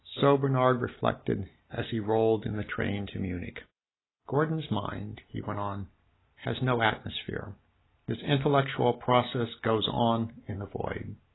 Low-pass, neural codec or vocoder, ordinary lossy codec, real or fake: 7.2 kHz; none; AAC, 16 kbps; real